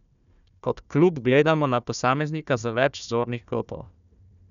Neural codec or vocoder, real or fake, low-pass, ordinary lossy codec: codec, 16 kHz, 1 kbps, FunCodec, trained on Chinese and English, 50 frames a second; fake; 7.2 kHz; none